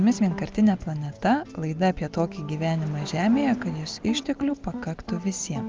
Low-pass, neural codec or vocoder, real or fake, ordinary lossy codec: 7.2 kHz; none; real; Opus, 32 kbps